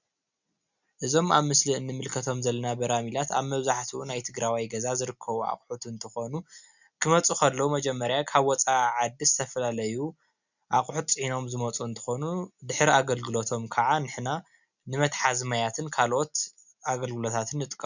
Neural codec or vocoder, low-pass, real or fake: none; 7.2 kHz; real